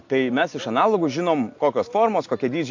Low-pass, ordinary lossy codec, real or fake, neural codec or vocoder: 7.2 kHz; AAC, 48 kbps; real; none